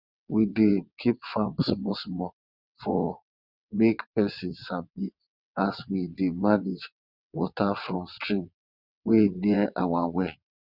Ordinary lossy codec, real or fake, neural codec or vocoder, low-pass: none; fake; vocoder, 22.05 kHz, 80 mel bands, WaveNeXt; 5.4 kHz